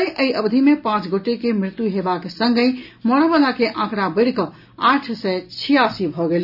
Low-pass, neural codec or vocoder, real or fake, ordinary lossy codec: 5.4 kHz; none; real; none